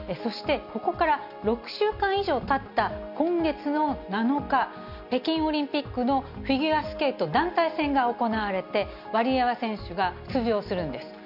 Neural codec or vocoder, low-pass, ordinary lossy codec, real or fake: none; 5.4 kHz; none; real